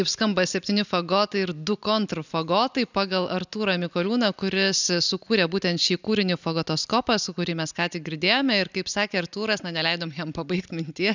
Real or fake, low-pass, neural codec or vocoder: real; 7.2 kHz; none